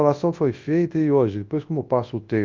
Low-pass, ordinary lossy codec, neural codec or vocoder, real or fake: 7.2 kHz; Opus, 32 kbps; codec, 24 kHz, 0.9 kbps, WavTokenizer, large speech release; fake